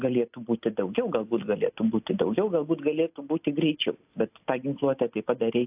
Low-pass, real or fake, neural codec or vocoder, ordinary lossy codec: 3.6 kHz; real; none; AAC, 32 kbps